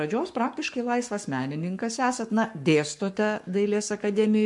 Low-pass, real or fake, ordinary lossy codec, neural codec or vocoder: 10.8 kHz; fake; MP3, 64 kbps; codec, 44.1 kHz, 7.8 kbps, Pupu-Codec